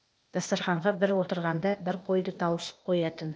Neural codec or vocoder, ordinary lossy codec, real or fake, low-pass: codec, 16 kHz, 0.8 kbps, ZipCodec; none; fake; none